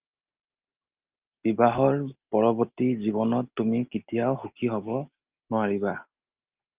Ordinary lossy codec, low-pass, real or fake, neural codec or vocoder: Opus, 32 kbps; 3.6 kHz; real; none